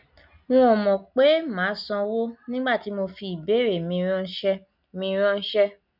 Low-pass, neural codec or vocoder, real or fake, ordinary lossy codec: 5.4 kHz; none; real; none